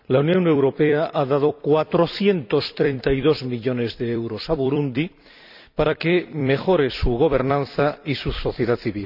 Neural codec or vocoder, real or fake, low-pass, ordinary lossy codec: vocoder, 44.1 kHz, 128 mel bands every 256 samples, BigVGAN v2; fake; 5.4 kHz; none